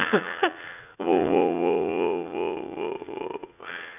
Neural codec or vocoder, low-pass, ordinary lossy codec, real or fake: vocoder, 44.1 kHz, 80 mel bands, Vocos; 3.6 kHz; none; fake